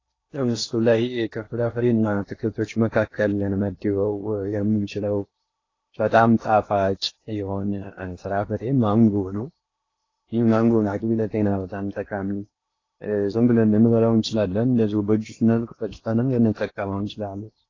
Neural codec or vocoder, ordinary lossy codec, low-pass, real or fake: codec, 16 kHz in and 24 kHz out, 0.8 kbps, FocalCodec, streaming, 65536 codes; AAC, 32 kbps; 7.2 kHz; fake